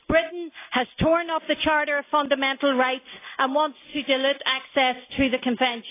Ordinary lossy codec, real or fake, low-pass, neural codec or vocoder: AAC, 24 kbps; real; 3.6 kHz; none